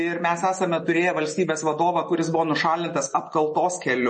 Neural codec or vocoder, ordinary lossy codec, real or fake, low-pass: none; MP3, 32 kbps; real; 10.8 kHz